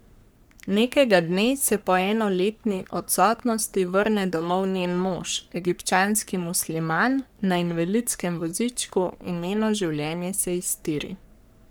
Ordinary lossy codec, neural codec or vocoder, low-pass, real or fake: none; codec, 44.1 kHz, 3.4 kbps, Pupu-Codec; none; fake